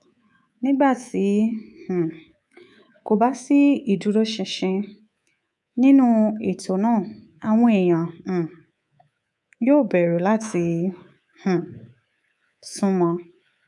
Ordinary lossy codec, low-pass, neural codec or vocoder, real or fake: none; 10.8 kHz; codec, 24 kHz, 3.1 kbps, DualCodec; fake